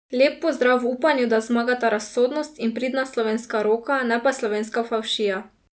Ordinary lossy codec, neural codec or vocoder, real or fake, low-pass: none; none; real; none